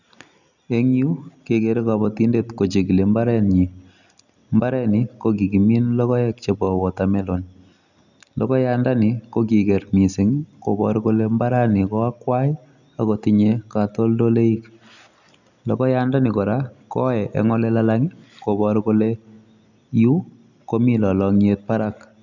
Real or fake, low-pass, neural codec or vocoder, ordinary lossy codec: real; 7.2 kHz; none; none